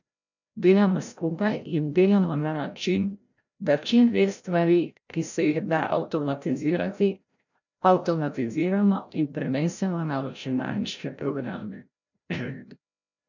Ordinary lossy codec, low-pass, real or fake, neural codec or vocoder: none; 7.2 kHz; fake; codec, 16 kHz, 0.5 kbps, FreqCodec, larger model